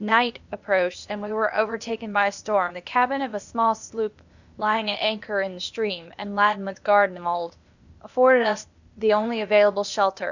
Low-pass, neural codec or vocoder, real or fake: 7.2 kHz; codec, 16 kHz, 0.8 kbps, ZipCodec; fake